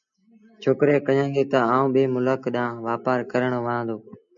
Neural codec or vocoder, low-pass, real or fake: none; 7.2 kHz; real